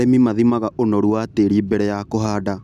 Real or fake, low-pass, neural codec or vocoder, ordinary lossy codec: real; 14.4 kHz; none; none